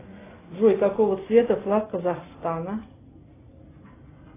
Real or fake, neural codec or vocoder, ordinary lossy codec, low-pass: real; none; AAC, 16 kbps; 3.6 kHz